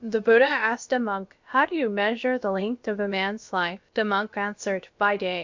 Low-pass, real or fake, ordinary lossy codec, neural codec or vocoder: 7.2 kHz; fake; MP3, 48 kbps; codec, 16 kHz, about 1 kbps, DyCAST, with the encoder's durations